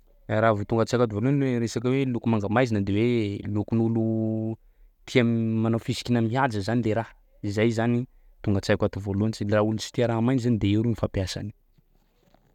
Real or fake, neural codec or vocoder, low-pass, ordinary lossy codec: real; none; 19.8 kHz; none